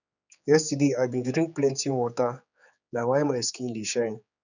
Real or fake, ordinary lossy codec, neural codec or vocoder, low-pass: fake; none; codec, 16 kHz, 4 kbps, X-Codec, HuBERT features, trained on general audio; 7.2 kHz